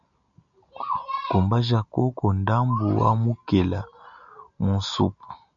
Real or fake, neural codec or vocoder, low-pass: real; none; 7.2 kHz